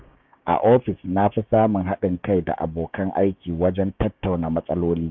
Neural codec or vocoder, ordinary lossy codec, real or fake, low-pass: codec, 16 kHz, 6 kbps, DAC; none; fake; 7.2 kHz